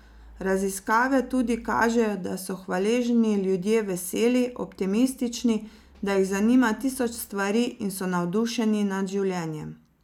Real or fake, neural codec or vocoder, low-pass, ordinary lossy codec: real; none; 19.8 kHz; none